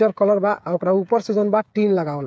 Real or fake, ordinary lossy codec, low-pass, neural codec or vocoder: fake; none; none; codec, 16 kHz, 8 kbps, FreqCodec, smaller model